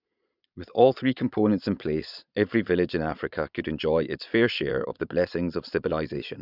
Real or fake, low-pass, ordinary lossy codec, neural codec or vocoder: fake; 5.4 kHz; none; vocoder, 22.05 kHz, 80 mel bands, Vocos